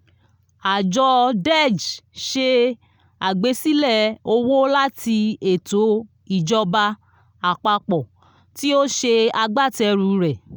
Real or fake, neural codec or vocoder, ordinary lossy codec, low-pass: real; none; none; none